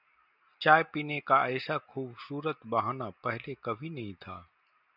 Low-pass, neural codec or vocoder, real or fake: 5.4 kHz; none; real